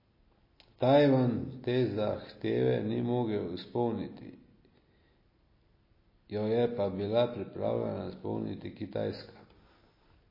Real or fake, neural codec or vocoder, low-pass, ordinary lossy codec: real; none; 5.4 kHz; MP3, 24 kbps